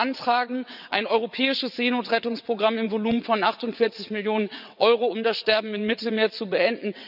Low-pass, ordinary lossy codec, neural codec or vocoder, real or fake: 5.4 kHz; none; vocoder, 22.05 kHz, 80 mel bands, WaveNeXt; fake